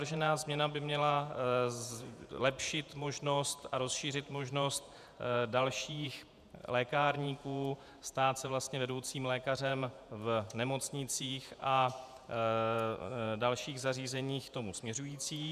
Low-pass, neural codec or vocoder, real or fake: 14.4 kHz; vocoder, 48 kHz, 128 mel bands, Vocos; fake